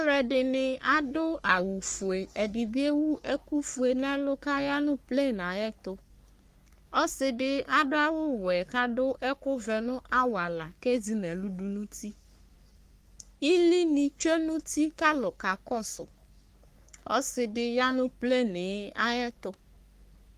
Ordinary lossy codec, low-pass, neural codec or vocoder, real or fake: Opus, 32 kbps; 14.4 kHz; codec, 44.1 kHz, 3.4 kbps, Pupu-Codec; fake